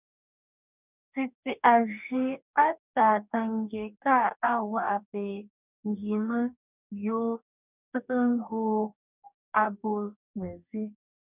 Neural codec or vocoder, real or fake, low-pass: codec, 44.1 kHz, 2.6 kbps, DAC; fake; 3.6 kHz